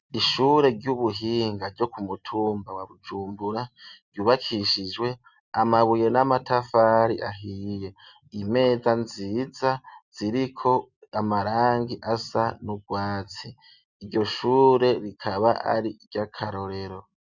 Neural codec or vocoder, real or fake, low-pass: none; real; 7.2 kHz